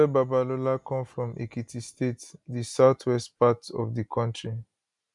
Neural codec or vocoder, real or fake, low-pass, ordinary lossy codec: none; real; 10.8 kHz; none